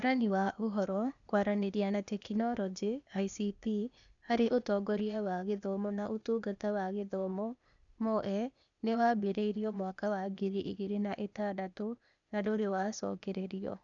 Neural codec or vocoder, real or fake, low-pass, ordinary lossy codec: codec, 16 kHz, 0.8 kbps, ZipCodec; fake; 7.2 kHz; none